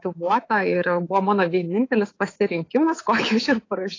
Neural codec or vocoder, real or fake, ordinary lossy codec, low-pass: codec, 24 kHz, 3.1 kbps, DualCodec; fake; AAC, 32 kbps; 7.2 kHz